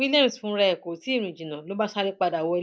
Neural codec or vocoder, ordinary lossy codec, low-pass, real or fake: none; none; none; real